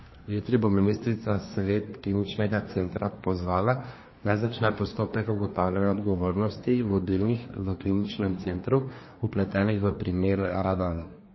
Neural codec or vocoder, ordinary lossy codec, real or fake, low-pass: codec, 24 kHz, 1 kbps, SNAC; MP3, 24 kbps; fake; 7.2 kHz